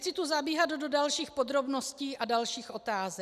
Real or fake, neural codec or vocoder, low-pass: real; none; 14.4 kHz